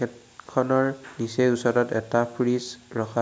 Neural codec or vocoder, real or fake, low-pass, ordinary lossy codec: none; real; none; none